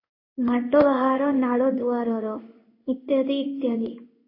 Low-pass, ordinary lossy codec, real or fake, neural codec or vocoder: 5.4 kHz; MP3, 24 kbps; fake; codec, 16 kHz in and 24 kHz out, 1 kbps, XY-Tokenizer